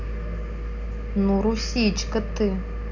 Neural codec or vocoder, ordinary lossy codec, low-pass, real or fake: none; none; 7.2 kHz; real